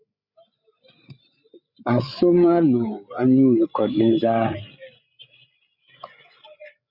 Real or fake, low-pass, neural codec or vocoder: fake; 5.4 kHz; codec, 16 kHz, 8 kbps, FreqCodec, larger model